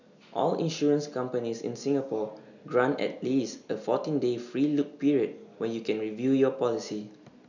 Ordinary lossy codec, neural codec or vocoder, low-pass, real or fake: none; none; 7.2 kHz; real